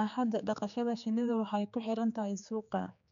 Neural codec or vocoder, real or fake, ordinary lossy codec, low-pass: codec, 16 kHz, 4 kbps, X-Codec, HuBERT features, trained on general audio; fake; none; 7.2 kHz